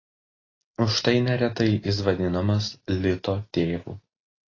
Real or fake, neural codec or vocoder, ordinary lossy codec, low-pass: real; none; AAC, 32 kbps; 7.2 kHz